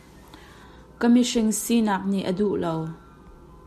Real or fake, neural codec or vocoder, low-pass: real; none; 14.4 kHz